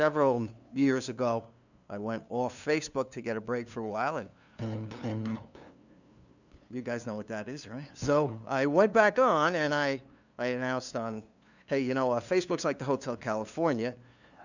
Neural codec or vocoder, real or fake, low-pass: codec, 16 kHz, 2 kbps, FunCodec, trained on LibriTTS, 25 frames a second; fake; 7.2 kHz